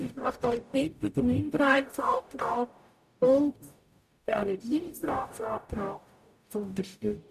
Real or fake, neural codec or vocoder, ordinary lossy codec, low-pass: fake; codec, 44.1 kHz, 0.9 kbps, DAC; none; 14.4 kHz